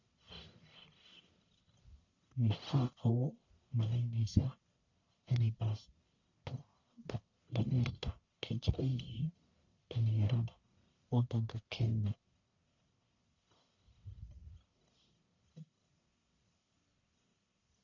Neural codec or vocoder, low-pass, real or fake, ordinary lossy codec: codec, 44.1 kHz, 1.7 kbps, Pupu-Codec; 7.2 kHz; fake; none